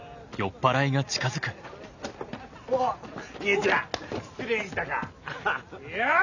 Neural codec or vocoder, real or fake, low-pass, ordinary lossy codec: none; real; 7.2 kHz; none